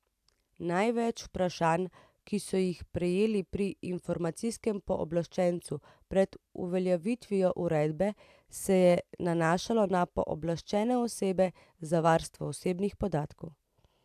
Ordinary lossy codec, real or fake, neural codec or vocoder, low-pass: AAC, 96 kbps; real; none; 14.4 kHz